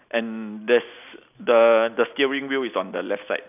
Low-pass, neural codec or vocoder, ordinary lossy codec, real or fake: 3.6 kHz; none; none; real